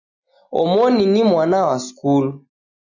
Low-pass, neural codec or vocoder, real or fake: 7.2 kHz; none; real